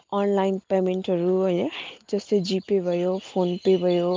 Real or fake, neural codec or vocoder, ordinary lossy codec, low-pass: real; none; Opus, 32 kbps; 7.2 kHz